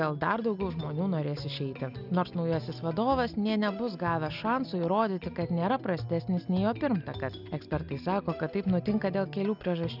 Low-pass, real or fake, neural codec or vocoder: 5.4 kHz; real; none